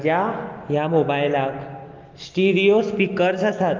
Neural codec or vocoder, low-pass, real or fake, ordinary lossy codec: none; 7.2 kHz; real; Opus, 32 kbps